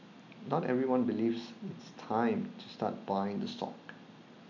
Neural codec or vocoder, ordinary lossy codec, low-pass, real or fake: none; none; 7.2 kHz; real